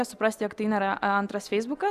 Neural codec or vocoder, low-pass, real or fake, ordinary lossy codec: none; 14.4 kHz; real; Opus, 64 kbps